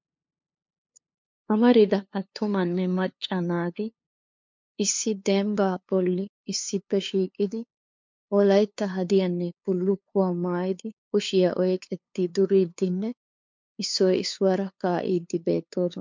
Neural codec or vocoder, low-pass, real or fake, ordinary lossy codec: codec, 16 kHz, 2 kbps, FunCodec, trained on LibriTTS, 25 frames a second; 7.2 kHz; fake; MP3, 64 kbps